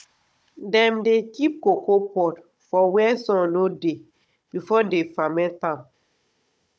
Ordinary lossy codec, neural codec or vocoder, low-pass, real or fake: none; codec, 16 kHz, 16 kbps, FunCodec, trained on Chinese and English, 50 frames a second; none; fake